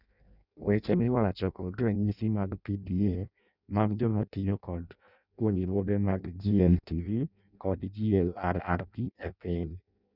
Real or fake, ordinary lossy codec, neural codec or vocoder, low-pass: fake; none; codec, 16 kHz in and 24 kHz out, 0.6 kbps, FireRedTTS-2 codec; 5.4 kHz